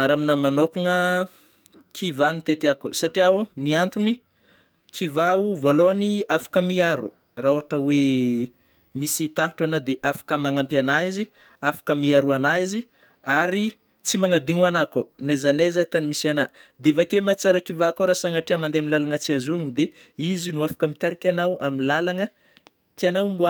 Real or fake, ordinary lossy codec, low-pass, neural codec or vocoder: fake; none; none; codec, 44.1 kHz, 2.6 kbps, SNAC